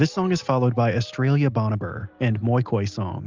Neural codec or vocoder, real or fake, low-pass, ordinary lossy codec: none; real; 7.2 kHz; Opus, 32 kbps